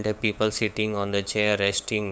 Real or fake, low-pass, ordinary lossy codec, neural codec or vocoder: fake; none; none; codec, 16 kHz, 8 kbps, FunCodec, trained on LibriTTS, 25 frames a second